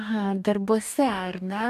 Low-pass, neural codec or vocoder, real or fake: 14.4 kHz; codec, 44.1 kHz, 2.6 kbps, DAC; fake